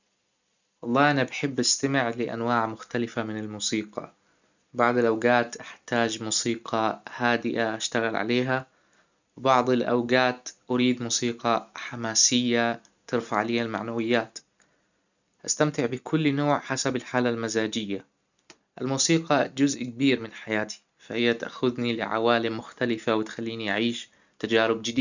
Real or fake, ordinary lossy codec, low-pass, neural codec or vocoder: real; none; 7.2 kHz; none